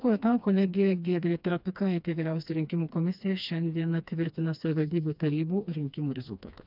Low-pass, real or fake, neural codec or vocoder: 5.4 kHz; fake; codec, 16 kHz, 2 kbps, FreqCodec, smaller model